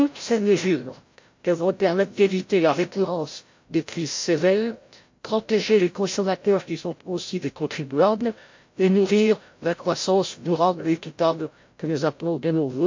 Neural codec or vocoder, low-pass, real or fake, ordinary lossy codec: codec, 16 kHz, 0.5 kbps, FreqCodec, larger model; 7.2 kHz; fake; MP3, 48 kbps